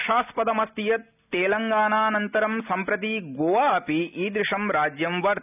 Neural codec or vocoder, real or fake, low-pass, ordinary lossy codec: none; real; 3.6 kHz; none